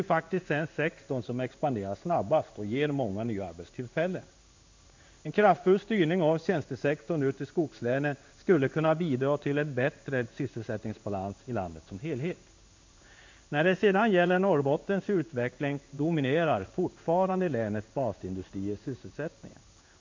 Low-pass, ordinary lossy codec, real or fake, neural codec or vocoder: 7.2 kHz; MP3, 64 kbps; fake; codec, 16 kHz in and 24 kHz out, 1 kbps, XY-Tokenizer